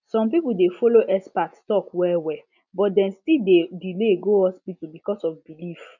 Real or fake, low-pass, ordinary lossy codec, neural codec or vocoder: real; 7.2 kHz; none; none